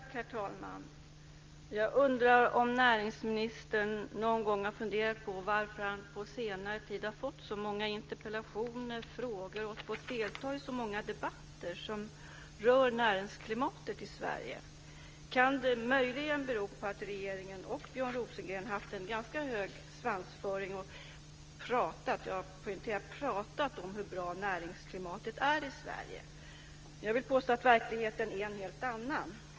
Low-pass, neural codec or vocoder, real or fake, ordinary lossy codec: 7.2 kHz; none; real; Opus, 24 kbps